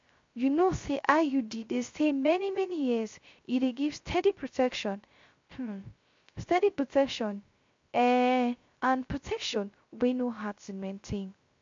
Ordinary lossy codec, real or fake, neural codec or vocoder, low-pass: MP3, 48 kbps; fake; codec, 16 kHz, 0.3 kbps, FocalCodec; 7.2 kHz